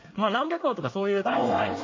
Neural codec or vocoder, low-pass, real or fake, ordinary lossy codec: codec, 24 kHz, 1 kbps, SNAC; 7.2 kHz; fake; MP3, 32 kbps